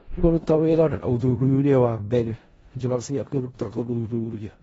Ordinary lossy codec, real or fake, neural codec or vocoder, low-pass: AAC, 24 kbps; fake; codec, 16 kHz in and 24 kHz out, 0.4 kbps, LongCat-Audio-Codec, four codebook decoder; 10.8 kHz